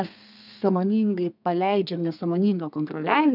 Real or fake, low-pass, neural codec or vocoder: fake; 5.4 kHz; codec, 32 kHz, 1.9 kbps, SNAC